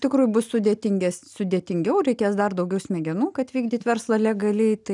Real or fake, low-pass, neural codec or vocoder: real; 10.8 kHz; none